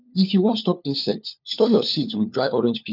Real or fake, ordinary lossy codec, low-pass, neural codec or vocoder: fake; none; 5.4 kHz; codec, 16 kHz, 4 kbps, FunCodec, trained on LibriTTS, 50 frames a second